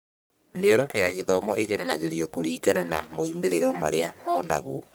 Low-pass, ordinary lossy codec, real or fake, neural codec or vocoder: none; none; fake; codec, 44.1 kHz, 1.7 kbps, Pupu-Codec